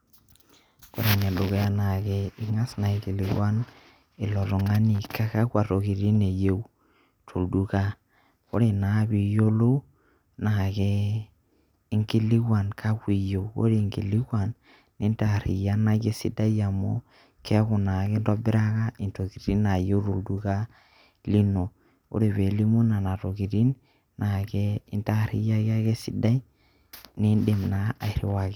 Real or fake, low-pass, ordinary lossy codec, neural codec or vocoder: real; 19.8 kHz; none; none